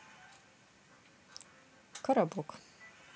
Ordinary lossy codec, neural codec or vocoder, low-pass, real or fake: none; none; none; real